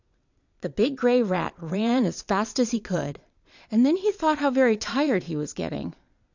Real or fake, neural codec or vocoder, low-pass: fake; vocoder, 22.05 kHz, 80 mel bands, Vocos; 7.2 kHz